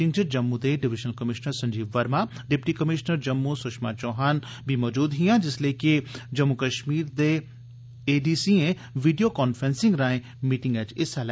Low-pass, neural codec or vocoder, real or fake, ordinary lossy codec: none; none; real; none